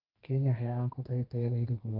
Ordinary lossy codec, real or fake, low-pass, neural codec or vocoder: Opus, 24 kbps; fake; 5.4 kHz; codec, 32 kHz, 1.9 kbps, SNAC